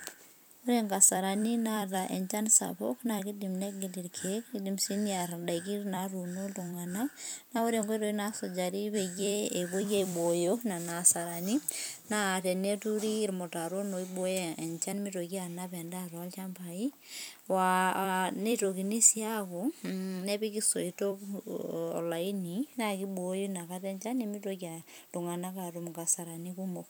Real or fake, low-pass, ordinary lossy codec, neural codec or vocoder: fake; none; none; vocoder, 44.1 kHz, 128 mel bands every 256 samples, BigVGAN v2